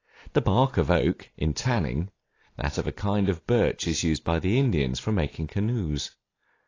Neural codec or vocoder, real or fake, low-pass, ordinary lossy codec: none; real; 7.2 kHz; AAC, 32 kbps